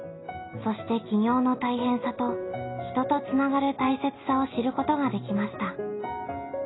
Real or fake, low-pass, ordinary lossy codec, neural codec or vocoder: real; 7.2 kHz; AAC, 16 kbps; none